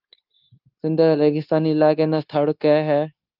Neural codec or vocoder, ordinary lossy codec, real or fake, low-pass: codec, 16 kHz, 0.9 kbps, LongCat-Audio-Codec; Opus, 24 kbps; fake; 5.4 kHz